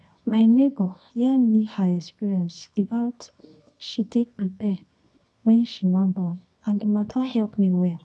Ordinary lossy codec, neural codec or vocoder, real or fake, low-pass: none; codec, 24 kHz, 0.9 kbps, WavTokenizer, medium music audio release; fake; none